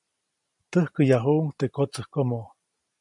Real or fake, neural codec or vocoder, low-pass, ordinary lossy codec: real; none; 10.8 kHz; MP3, 96 kbps